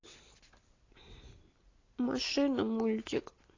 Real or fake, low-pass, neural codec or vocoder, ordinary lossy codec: real; 7.2 kHz; none; AAC, 32 kbps